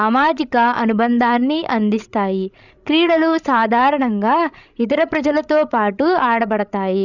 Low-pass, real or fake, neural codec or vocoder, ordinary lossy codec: 7.2 kHz; fake; codec, 16 kHz, 16 kbps, FreqCodec, larger model; none